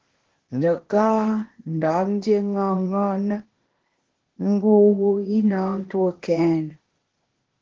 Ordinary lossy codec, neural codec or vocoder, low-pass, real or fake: Opus, 16 kbps; codec, 16 kHz, 0.8 kbps, ZipCodec; 7.2 kHz; fake